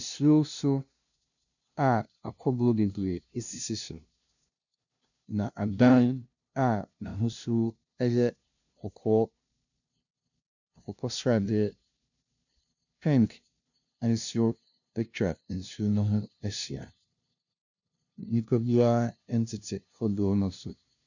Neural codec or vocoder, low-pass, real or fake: codec, 16 kHz, 0.5 kbps, FunCodec, trained on LibriTTS, 25 frames a second; 7.2 kHz; fake